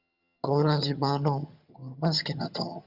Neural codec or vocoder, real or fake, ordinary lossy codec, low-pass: vocoder, 22.05 kHz, 80 mel bands, HiFi-GAN; fake; Opus, 64 kbps; 5.4 kHz